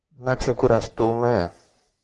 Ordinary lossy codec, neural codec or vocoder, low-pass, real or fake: Opus, 24 kbps; codec, 44.1 kHz, 3.4 kbps, Pupu-Codec; 10.8 kHz; fake